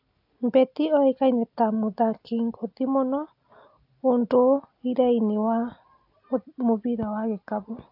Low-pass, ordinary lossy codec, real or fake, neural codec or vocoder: 5.4 kHz; AAC, 48 kbps; real; none